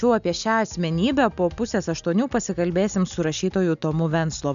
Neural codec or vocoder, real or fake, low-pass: none; real; 7.2 kHz